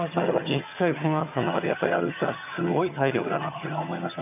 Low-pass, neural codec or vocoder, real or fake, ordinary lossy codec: 3.6 kHz; vocoder, 22.05 kHz, 80 mel bands, HiFi-GAN; fake; none